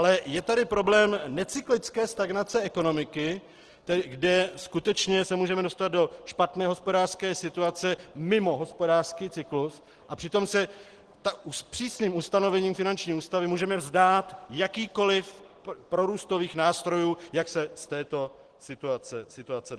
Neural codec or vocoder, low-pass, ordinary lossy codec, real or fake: none; 10.8 kHz; Opus, 16 kbps; real